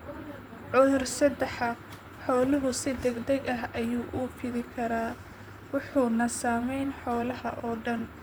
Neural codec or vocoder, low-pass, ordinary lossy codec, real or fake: vocoder, 44.1 kHz, 128 mel bands, Pupu-Vocoder; none; none; fake